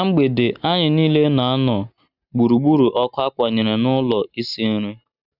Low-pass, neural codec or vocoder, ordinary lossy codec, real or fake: 5.4 kHz; none; none; real